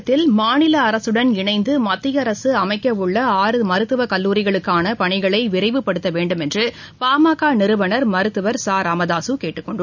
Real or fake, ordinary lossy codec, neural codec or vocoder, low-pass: real; none; none; 7.2 kHz